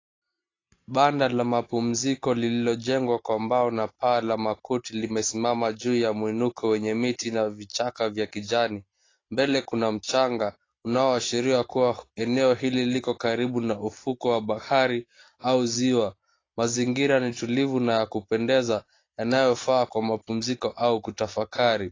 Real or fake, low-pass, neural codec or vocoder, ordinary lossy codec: real; 7.2 kHz; none; AAC, 32 kbps